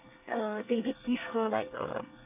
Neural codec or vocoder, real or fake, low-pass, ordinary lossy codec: codec, 24 kHz, 1 kbps, SNAC; fake; 3.6 kHz; none